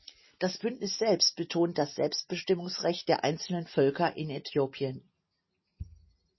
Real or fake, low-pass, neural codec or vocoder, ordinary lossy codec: real; 7.2 kHz; none; MP3, 24 kbps